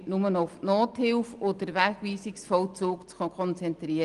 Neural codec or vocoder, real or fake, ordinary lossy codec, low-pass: none; real; Opus, 24 kbps; 10.8 kHz